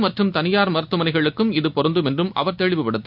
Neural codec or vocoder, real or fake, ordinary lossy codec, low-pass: none; real; none; 5.4 kHz